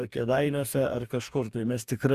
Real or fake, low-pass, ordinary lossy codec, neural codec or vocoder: fake; 14.4 kHz; Opus, 64 kbps; codec, 44.1 kHz, 2.6 kbps, DAC